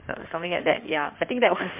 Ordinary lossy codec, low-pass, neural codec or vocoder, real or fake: MP3, 32 kbps; 3.6 kHz; codec, 16 kHz in and 24 kHz out, 2.2 kbps, FireRedTTS-2 codec; fake